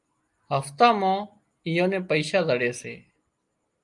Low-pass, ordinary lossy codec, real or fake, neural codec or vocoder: 10.8 kHz; Opus, 32 kbps; real; none